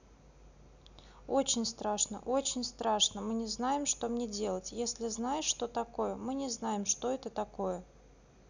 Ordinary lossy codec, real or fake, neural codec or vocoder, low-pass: none; real; none; 7.2 kHz